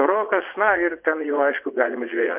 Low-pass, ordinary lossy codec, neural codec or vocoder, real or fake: 3.6 kHz; MP3, 32 kbps; vocoder, 22.05 kHz, 80 mel bands, WaveNeXt; fake